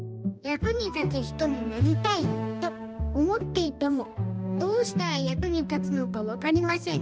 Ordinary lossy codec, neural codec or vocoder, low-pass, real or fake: none; codec, 16 kHz, 1 kbps, X-Codec, HuBERT features, trained on balanced general audio; none; fake